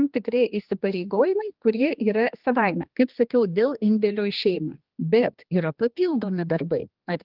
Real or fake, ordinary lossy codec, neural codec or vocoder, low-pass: fake; Opus, 32 kbps; codec, 16 kHz, 2 kbps, X-Codec, HuBERT features, trained on general audio; 5.4 kHz